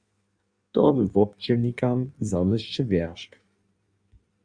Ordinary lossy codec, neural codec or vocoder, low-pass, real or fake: Opus, 64 kbps; codec, 16 kHz in and 24 kHz out, 1.1 kbps, FireRedTTS-2 codec; 9.9 kHz; fake